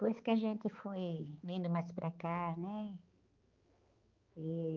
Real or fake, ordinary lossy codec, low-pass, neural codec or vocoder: fake; Opus, 24 kbps; 7.2 kHz; codec, 16 kHz, 4 kbps, X-Codec, HuBERT features, trained on balanced general audio